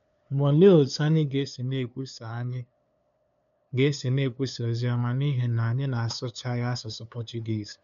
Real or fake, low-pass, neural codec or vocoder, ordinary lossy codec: fake; 7.2 kHz; codec, 16 kHz, 2 kbps, FunCodec, trained on LibriTTS, 25 frames a second; MP3, 96 kbps